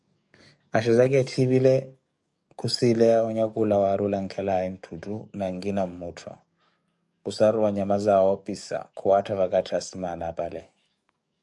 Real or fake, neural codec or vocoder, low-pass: fake; codec, 44.1 kHz, 7.8 kbps, DAC; 10.8 kHz